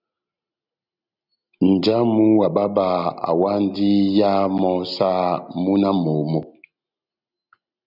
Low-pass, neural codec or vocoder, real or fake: 5.4 kHz; none; real